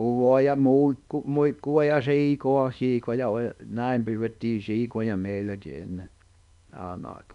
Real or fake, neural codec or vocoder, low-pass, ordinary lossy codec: fake; codec, 24 kHz, 0.9 kbps, WavTokenizer, small release; 10.8 kHz; none